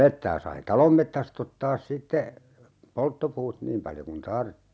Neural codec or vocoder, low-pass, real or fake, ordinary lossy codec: none; none; real; none